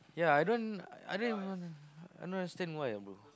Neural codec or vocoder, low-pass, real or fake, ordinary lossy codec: none; none; real; none